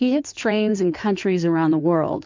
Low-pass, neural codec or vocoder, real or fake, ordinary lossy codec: 7.2 kHz; codec, 16 kHz in and 24 kHz out, 2.2 kbps, FireRedTTS-2 codec; fake; MP3, 64 kbps